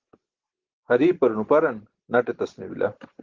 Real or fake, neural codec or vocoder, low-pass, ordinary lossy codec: real; none; 7.2 kHz; Opus, 16 kbps